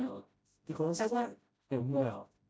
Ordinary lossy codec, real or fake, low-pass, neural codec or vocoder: none; fake; none; codec, 16 kHz, 0.5 kbps, FreqCodec, smaller model